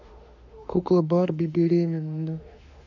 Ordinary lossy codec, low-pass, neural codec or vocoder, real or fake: AAC, 48 kbps; 7.2 kHz; autoencoder, 48 kHz, 32 numbers a frame, DAC-VAE, trained on Japanese speech; fake